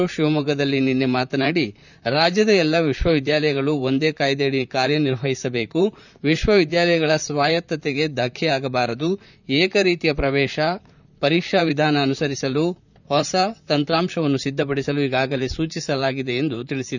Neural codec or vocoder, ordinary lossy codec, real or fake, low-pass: vocoder, 44.1 kHz, 128 mel bands, Pupu-Vocoder; none; fake; 7.2 kHz